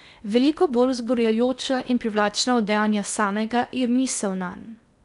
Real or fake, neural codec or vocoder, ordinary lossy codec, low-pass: fake; codec, 16 kHz in and 24 kHz out, 0.8 kbps, FocalCodec, streaming, 65536 codes; none; 10.8 kHz